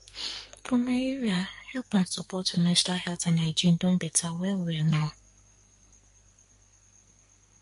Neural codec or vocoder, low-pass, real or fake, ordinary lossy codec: codec, 44.1 kHz, 2.6 kbps, SNAC; 14.4 kHz; fake; MP3, 48 kbps